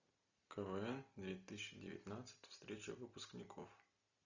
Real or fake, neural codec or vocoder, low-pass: real; none; 7.2 kHz